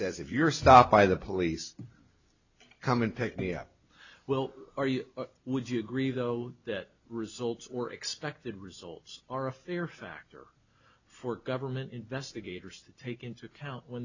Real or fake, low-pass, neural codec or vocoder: real; 7.2 kHz; none